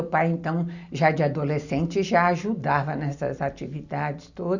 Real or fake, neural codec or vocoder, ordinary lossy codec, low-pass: real; none; none; 7.2 kHz